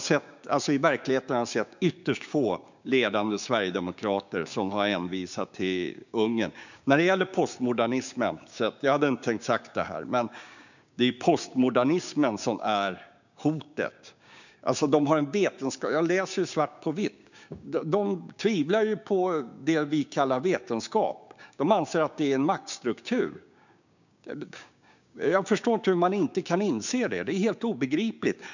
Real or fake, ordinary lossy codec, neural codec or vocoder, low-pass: fake; none; codec, 16 kHz, 6 kbps, DAC; 7.2 kHz